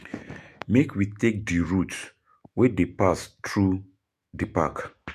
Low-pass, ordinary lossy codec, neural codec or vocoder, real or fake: 14.4 kHz; MP3, 64 kbps; autoencoder, 48 kHz, 128 numbers a frame, DAC-VAE, trained on Japanese speech; fake